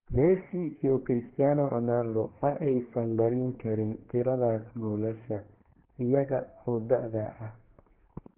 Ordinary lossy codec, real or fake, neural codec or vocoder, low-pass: Opus, 16 kbps; fake; codec, 24 kHz, 1 kbps, SNAC; 3.6 kHz